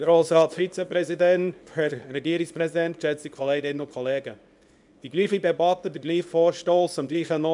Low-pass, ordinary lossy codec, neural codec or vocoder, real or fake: 10.8 kHz; none; codec, 24 kHz, 0.9 kbps, WavTokenizer, small release; fake